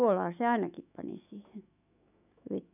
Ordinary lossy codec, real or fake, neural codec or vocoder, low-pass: none; fake; autoencoder, 48 kHz, 128 numbers a frame, DAC-VAE, trained on Japanese speech; 3.6 kHz